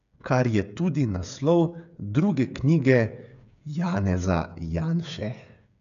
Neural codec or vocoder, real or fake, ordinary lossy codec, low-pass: codec, 16 kHz, 8 kbps, FreqCodec, smaller model; fake; none; 7.2 kHz